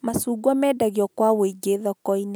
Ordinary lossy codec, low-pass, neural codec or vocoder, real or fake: none; none; none; real